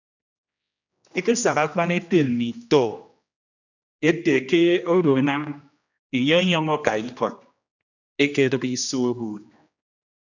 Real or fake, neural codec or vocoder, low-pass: fake; codec, 16 kHz, 1 kbps, X-Codec, HuBERT features, trained on general audio; 7.2 kHz